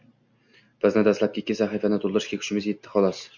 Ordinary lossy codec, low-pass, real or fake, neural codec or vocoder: MP3, 64 kbps; 7.2 kHz; real; none